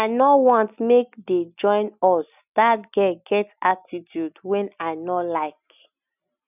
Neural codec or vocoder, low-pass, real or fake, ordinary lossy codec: none; 3.6 kHz; real; none